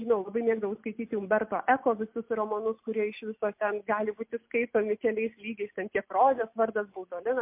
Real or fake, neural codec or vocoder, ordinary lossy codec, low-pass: real; none; MP3, 32 kbps; 3.6 kHz